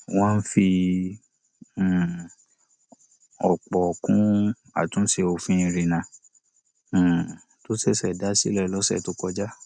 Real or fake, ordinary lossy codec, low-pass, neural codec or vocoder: real; none; 9.9 kHz; none